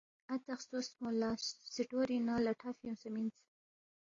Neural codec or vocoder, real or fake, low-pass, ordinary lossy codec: none; real; 7.2 kHz; AAC, 32 kbps